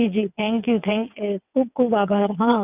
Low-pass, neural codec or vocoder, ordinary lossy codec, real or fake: 3.6 kHz; vocoder, 22.05 kHz, 80 mel bands, WaveNeXt; none; fake